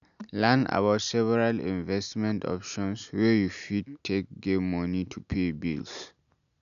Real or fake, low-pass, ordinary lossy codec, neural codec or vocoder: real; 7.2 kHz; none; none